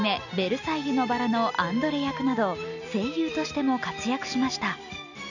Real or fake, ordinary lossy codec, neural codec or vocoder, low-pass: real; none; none; 7.2 kHz